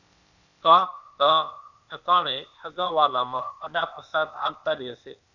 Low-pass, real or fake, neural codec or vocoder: 7.2 kHz; fake; codec, 16 kHz, 0.8 kbps, ZipCodec